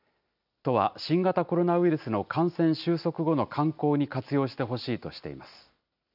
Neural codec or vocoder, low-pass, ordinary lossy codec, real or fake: none; 5.4 kHz; none; real